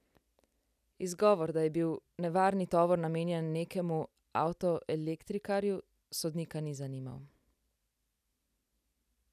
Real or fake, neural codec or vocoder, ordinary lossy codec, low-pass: real; none; none; 14.4 kHz